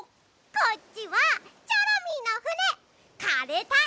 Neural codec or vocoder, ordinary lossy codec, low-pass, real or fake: none; none; none; real